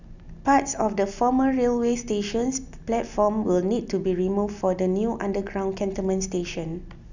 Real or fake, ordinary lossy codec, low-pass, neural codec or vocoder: real; none; 7.2 kHz; none